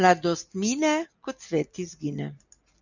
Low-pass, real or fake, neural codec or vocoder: 7.2 kHz; real; none